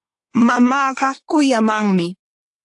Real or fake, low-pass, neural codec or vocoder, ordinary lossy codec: fake; 10.8 kHz; codec, 24 kHz, 1 kbps, SNAC; AAC, 48 kbps